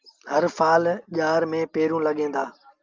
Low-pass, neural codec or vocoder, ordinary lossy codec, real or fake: 7.2 kHz; none; Opus, 24 kbps; real